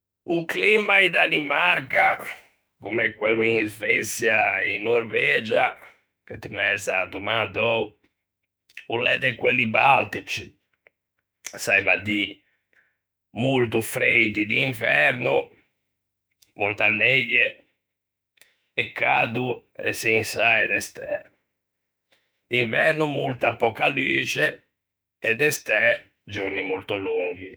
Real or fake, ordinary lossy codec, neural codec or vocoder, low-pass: fake; none; autoencoder, 48 kHz, 32 numbers a frame, DAC-VAE, trained on Japanese speech; none